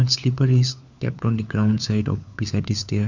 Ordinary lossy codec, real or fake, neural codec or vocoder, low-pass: none; fake; codec, 16 kHz, 4 kbps, FunCodec, trained on LibriTTS, 50 frames a second; 7.2 kHz